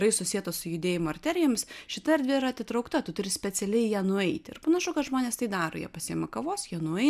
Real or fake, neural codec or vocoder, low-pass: real; none; 14.4 kHz